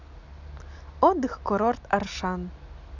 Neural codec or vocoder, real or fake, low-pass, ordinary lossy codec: none; real; 7.2 kHz; none